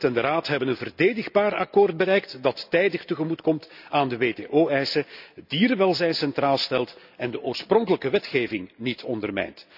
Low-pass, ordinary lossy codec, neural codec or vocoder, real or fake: 5.4 kHz; none; none; real